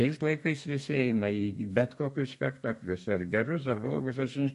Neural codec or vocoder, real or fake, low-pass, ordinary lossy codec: codec, 32 kHz, 1.9 kbps, SNAC; fake; 14.4 kHz; MP3, 48 kbps